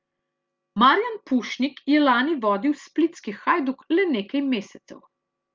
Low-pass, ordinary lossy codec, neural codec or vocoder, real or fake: 7.2 kHz; Opus, 32 kbps; none; real